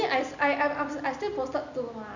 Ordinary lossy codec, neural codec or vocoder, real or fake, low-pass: none; none; real; 7.2 kHz